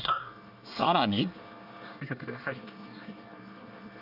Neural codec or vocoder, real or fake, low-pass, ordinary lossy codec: codec, 24 kHz, 1 kbps, SNAC; fake; 5.4 kHz; none